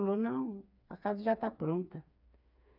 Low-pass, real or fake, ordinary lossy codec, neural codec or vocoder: 5.4 kHz; fake; none; codec, 16 kHz, 4 kbps, FreqCodec, smaller model